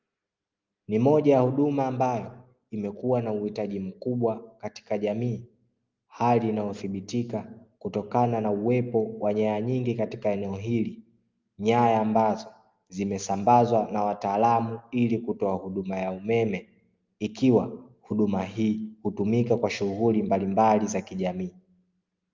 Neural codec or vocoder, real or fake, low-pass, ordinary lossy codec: none; real; 7.2 kHz; Opus, 24 kbps